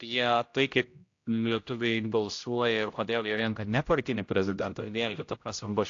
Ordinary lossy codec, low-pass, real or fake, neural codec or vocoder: AAC, 48 kbps; 7.2 kHz; fake; codec, 16 kHz, 0.5 kbps, X-Codec, HuBERT features, trained on general audio